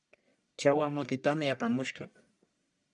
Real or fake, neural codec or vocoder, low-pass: fake; codec, 44.1 kHz, 1.7 kbps, Pupu-Codec; 10.8 kHz